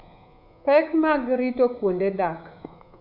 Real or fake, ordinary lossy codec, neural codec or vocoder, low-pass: fake; none; codec, 24 kHz, 3.1 kbps, DualCodec; 5.4 kHz